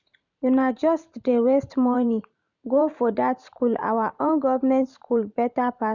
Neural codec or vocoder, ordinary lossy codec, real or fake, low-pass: vocoder, 44.1 kHz, 128 mel bands every 512 samples, BigVGAN v2; none; fake; 7.2 kHz